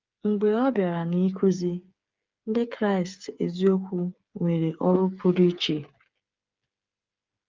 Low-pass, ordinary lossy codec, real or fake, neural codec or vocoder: 7.2 kHz; Opus, 24 kbps; fake; codec, 16 kHz, 8 kbps, FreqCodec, smaller model